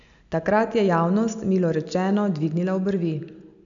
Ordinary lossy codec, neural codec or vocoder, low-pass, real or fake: none; none; 7.2 kHz; real